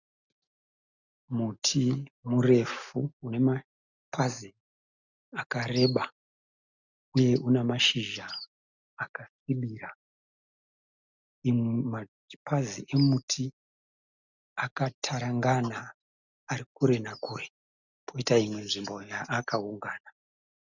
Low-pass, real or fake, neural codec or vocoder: 7.2 kHz; real; none